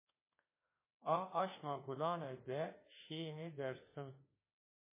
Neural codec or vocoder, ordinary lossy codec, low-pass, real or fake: autoencoder, 48 kHz, 32 numbers a frame, DAC-VAE, trained on Japanese speech; MP3, 16 kbps; 3.6 kHz; fake